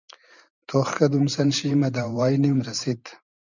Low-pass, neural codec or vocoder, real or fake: 7.2 kHz; vocoder, 44.1 kHz, 128 mel bands every 512 samples, BigVGAN v2; fake